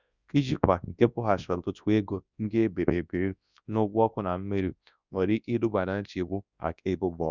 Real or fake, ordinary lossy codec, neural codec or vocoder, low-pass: fake; Opus, 64 kbps; codec, 24 kHz, 0.9 kbps, WavTokenizer, large speech release; 7.2 kHz